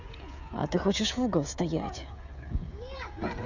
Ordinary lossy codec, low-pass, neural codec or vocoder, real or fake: none; 7.2 kHz; codec, 16 kHz, 16 kbps, FreqCodec, smaller model; fake